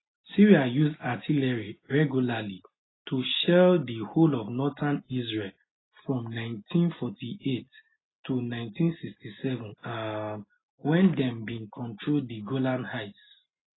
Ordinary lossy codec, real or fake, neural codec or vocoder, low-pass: AAC, 16 kbps; real; none; 7.2 kHz